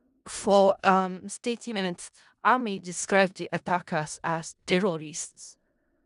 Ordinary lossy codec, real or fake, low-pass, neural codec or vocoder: none; fake; 10.8 kHz; codec, 16 kHz in and 24 kHz out, 0.4 kbps, LongCat-Audio-Codec, four codebook decoder